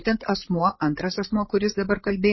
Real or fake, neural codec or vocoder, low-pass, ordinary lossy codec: fake; codec, 16 kHz, 8 kbps, FreqCodec, larger model; 7.2 kHz; MP3, 24 kbps